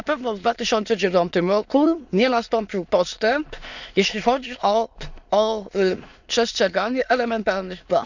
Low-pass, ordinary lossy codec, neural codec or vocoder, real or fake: 7.2 kHz; none; autoencoder, 22.05 kHz, a latent of 192 numbers a frame, VITS, trained on many speakers; fake